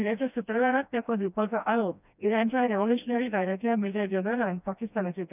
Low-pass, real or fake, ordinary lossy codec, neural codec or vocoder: 3.6 kHz; fake; none; codec, 16 kHz, 1 kbps, FreqCodec, smaller model